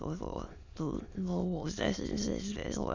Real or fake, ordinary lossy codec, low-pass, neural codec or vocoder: fake; none; 7.2 kHz; autoencoder, 22.05 kHz, a latent of 192 numbers a frame, VITS, trained on many speakers